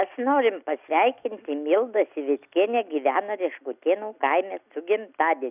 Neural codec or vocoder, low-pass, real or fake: none; 3.6 kHz; real